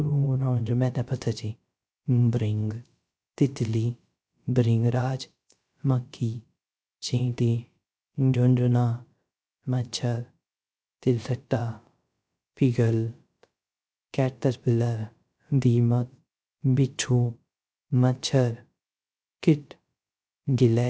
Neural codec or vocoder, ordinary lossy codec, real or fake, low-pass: codec, 16 kHz, 0.3 kbps, FocalCodec; none; fake; none